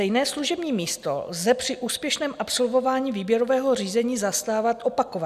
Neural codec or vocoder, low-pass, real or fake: none; 14.4 kHz; real